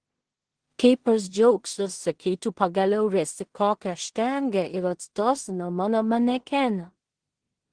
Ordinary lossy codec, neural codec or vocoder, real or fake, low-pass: Opus, 16 kbps; codec, 16 kHz in and 24 kHz out, 0.4 kbps, LongCat-Audio-Codec, two codebook decoder; fake; 9.9 kHz